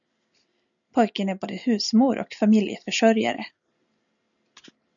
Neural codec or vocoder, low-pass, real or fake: none; 7.2 kHz; real